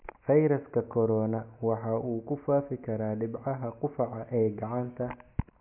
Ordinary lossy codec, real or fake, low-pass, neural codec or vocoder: MP3, 32 kbps; real; 3.6 kHz; none